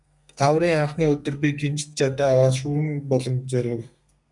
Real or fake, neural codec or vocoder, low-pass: fake; codec, 32 kHz, 1.9 kbps, SNAC; 10.8 kHz